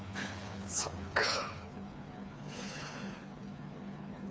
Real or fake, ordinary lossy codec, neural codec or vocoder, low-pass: fake; none; codec, 16 kHz, 4 kbps, FreqCodec, smaller model; none